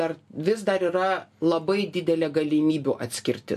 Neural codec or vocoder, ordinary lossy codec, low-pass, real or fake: none; MP3, 64 kbps; 14.4 kHz; real